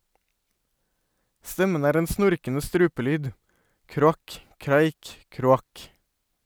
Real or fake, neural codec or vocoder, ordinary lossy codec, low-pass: real; none; none; none